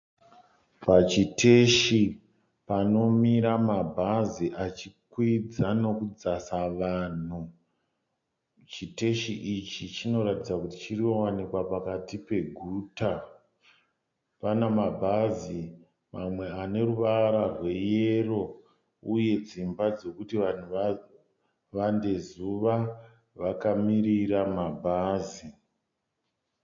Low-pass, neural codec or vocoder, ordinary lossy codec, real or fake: 7.2 kHz; none; MP3, 48 kbps; real